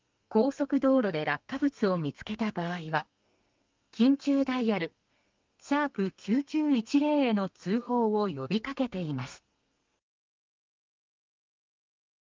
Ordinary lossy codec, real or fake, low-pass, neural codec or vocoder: Opus, 24 kbps; fake; 7.2 kHz; codec, 32 kHz, 1.9 kbps, SNAC